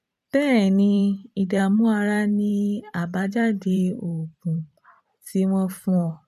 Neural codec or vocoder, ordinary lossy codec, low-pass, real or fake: vocoder, 44.1 kHz, 128 mel bands every 512 samples, BigVGAN v2; none; 14.4 kHz; fake